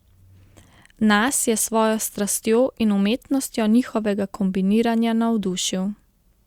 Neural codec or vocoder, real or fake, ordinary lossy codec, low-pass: none; real; Opus, 64 kbps; 19.8 kHz